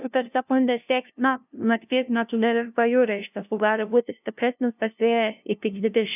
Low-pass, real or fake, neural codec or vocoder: 3.6 kHz; fake; codec, 16 kHz, 0.5 kbps, FunCodec, trained on LibriTTS, 25 frames a second